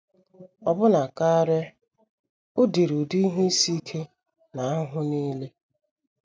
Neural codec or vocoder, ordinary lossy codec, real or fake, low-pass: none; none; real; none